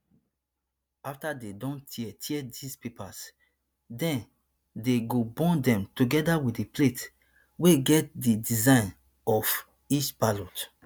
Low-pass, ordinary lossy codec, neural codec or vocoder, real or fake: none; none; none; real